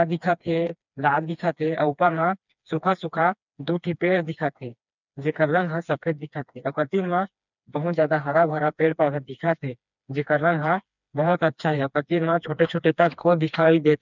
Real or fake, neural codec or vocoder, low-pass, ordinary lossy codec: fake; codec, 16 kHz, 2 kbps, FreqCodec, smaller model; 7.2 kHz; none